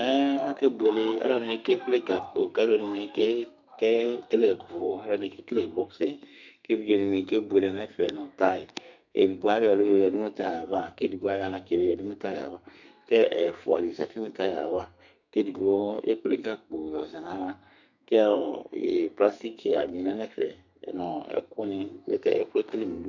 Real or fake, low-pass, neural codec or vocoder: fake; 7.2 kHz; codec, 32 kHz, 1.9 kbps, SNAC